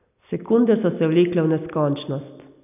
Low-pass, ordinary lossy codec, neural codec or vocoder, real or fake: 3.6 kHz; none; none; real